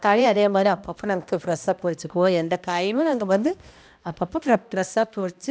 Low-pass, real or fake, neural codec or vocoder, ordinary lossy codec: none; fake; codec, 16 kHz, 1 kbps, X-Codec, HuBERT features, trained on balanced general audio; none